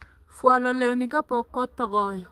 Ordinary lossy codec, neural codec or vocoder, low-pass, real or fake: Opus, 32 kbps; codec, 32 kHz, 1.9 kbps, SNAC; 14.4 kHz; fake